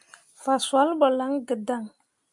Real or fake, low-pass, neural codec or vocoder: real; 10.8 kHz; none